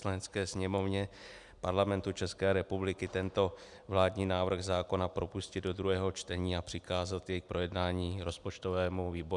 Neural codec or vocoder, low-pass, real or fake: none; 10.8 kHz; real